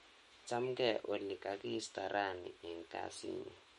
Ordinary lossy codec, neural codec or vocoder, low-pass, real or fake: MP3, 48 kbps; autoencoder, 48 kHz, 128 numbers a frame, DAC-VAE, trained on Japanese speech; 19.8 kHz; fake